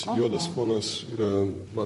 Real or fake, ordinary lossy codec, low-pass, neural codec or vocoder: fake; MP3, 48 kbps; 14.4 kHz; vocoder, 44.1 kHz, 128 mel bands, Pupu-Vocoder